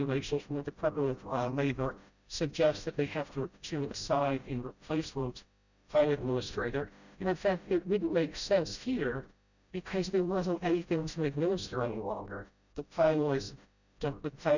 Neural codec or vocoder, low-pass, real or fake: codec, 16 kHz, 0.5 kbps, FreqCodec, smaller model; 7.2 kHz; fake